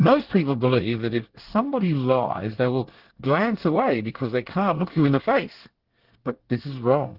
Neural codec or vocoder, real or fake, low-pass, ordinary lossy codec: codec, 24 kHz, 1 kbps, SNAC; fake; 5.4 kHz; Opus, 16 kbps